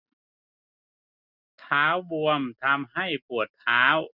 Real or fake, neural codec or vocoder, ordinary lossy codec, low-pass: real; none; none; 5.4 kHz